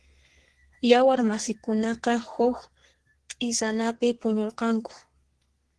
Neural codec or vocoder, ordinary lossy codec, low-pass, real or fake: codec, 32 kHz, 1.9 kbps, SNAC; Opus, 16 kbps; 10.8 kHz; fake